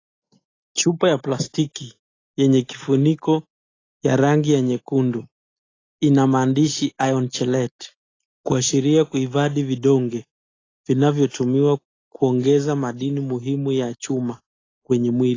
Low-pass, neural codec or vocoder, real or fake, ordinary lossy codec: 7.2 kHz; none; real; AAC, 32 kbps